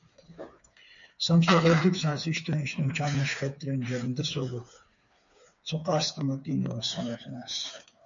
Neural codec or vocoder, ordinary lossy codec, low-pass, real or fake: codec, 16 kHz, 4 kbps, FreqCodec, smaller model; MP3, 64 kbps; 7.2 kHz; fake